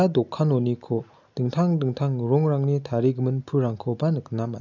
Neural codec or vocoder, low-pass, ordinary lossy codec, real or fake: none; 7.2 kHz; none; real